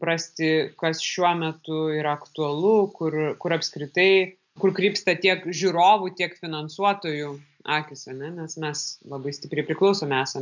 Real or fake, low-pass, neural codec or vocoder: real; 7.2 kHz; none